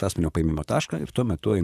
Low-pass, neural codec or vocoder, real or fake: 14.4 kHz; vocoder, 44.1 kHz, 128 mel bands, Pupu-Vocoder; fake